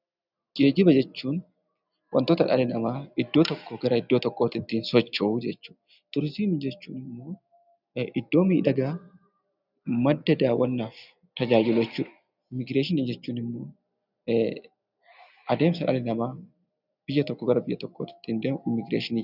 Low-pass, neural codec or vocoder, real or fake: 5.4 kHz; none; real